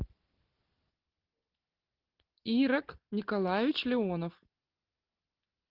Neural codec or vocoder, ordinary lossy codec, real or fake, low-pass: none; Opus, 16 kbps; real; 5.4 kHz